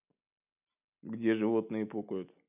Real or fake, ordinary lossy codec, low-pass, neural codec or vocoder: real; none; 3.6 kHz; none